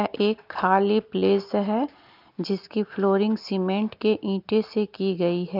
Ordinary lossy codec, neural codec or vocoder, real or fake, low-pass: Opus, 24 kbps; none; real; 5.4 kHz